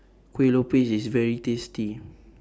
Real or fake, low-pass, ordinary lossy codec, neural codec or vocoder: real; none; none; none